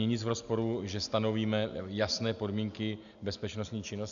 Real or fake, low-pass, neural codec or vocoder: real; 7.2 kHz; none